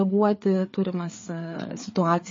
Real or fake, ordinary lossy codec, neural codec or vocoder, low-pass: fake; MP3, 32 kbps; codec, 16 kHz, 4 kbps, FreqCodec, larger model; 7.2 kHz